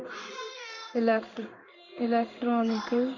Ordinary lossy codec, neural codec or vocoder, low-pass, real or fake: none; codec, 16 kHz in and 24 kHz out, 1 kbps, XY-Tokenizer; 7.2 kHz; fake